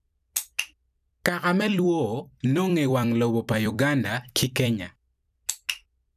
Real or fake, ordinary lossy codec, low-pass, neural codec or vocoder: fake; none; 14.4 kHz; vocoder, 44.1 kHz, 128 mel bands every 256 samples, BigVGAN v2